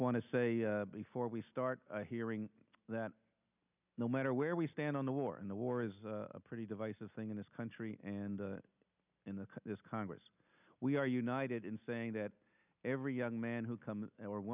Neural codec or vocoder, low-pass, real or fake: none; 3.6 kHz; real